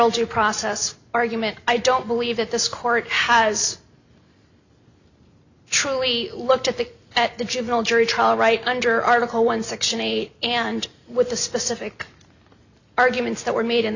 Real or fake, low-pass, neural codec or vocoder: real; 7.2 kHz; none